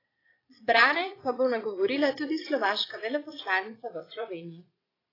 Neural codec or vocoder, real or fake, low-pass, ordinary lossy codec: vocoder, 22.05 kHz, 80 mel bands, Vocos; fake; 5.4 kHz; AAC, 24 kbps